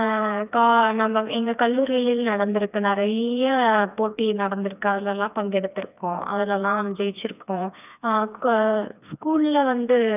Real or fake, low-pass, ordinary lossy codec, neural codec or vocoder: fake; 3.6 kHz; none; codec, 16 kHz, 2 kbps, FreqCodec, smaller model